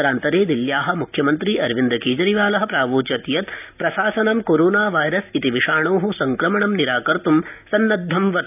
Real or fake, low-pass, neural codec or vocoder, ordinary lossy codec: real; 3.6 kHz; none; none